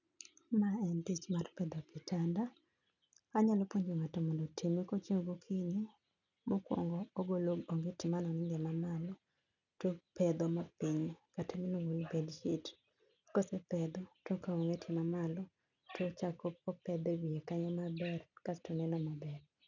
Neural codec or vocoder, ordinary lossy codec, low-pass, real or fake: none; none; 7.2 kHz; real